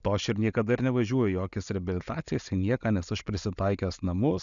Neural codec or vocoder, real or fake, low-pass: none; real; 7.2 kHz